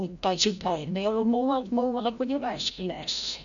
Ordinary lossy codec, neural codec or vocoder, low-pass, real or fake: none; codec, 16 kHz, 0.5 kbps, FreqCodec, larger model; 7.2 kHz; fake